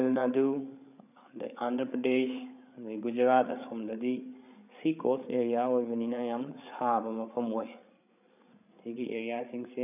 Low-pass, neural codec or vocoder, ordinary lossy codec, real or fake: 3.6 kHz; codec, 16 kHz, 8 kbps, FreqCodec, larger model; none; fake